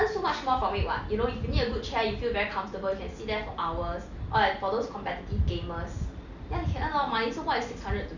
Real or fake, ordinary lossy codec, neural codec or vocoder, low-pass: real; none; none; 7.2 kHz